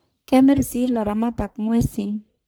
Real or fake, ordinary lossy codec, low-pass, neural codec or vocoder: fake; none; none; codec, 44.1 kHz, 1.7 kbps, Pupu-Codec